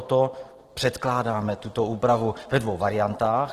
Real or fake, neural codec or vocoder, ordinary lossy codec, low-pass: fake; vocoder, 44.1 kHz, 128 mel bands every 512 samples, BigVGAN v2; Opus, 16 kbps; 14.4 kHz